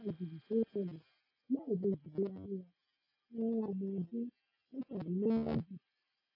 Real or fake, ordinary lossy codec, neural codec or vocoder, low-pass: real; AAC, 32 kbps; none; 5.4 kHz